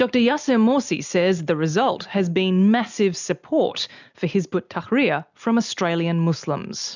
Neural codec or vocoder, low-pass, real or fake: none; 7.2 kHz; real